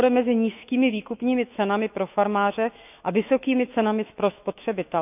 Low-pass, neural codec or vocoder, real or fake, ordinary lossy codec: 3.6 kHz; autoencoder, 48 kHz, 128 numbers a frame, DAC-VAE, trained on Japanese speech; fake; none